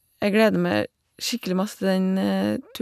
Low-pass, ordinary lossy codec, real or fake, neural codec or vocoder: 14.4 kHz; none; real; none